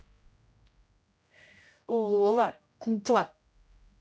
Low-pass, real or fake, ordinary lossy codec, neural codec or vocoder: none; fake; none; codec, 16 kHz, 0.5 kbps, X-Codec, HuBERT features, trained on general audio